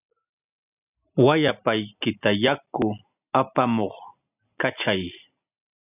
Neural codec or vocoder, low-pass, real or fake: none; 3.6 kHz; real